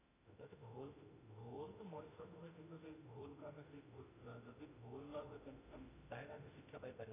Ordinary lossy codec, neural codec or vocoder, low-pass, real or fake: none; autoencoder, 48 kHz, 32 numbers a frame, DAC-VAE, trained on Japanese speech; 3.6 kHz; fake